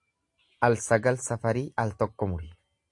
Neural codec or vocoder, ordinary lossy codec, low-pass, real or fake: none; AAC, 64 kbps; 10.8 kHz; real